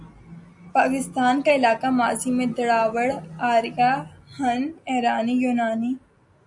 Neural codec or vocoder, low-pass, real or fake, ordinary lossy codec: none; 10.8 kHz; real; AAC, 64 kbps